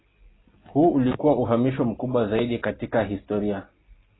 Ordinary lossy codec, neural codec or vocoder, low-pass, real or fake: AAC, 16 kbps; none; 7.2 kHz; real